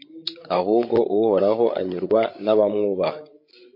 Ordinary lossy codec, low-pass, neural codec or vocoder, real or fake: MP3, 32 kbps; 5.4 kHz; codec, 16 kHz, 16 kbps, FreqCodec, larger model; fake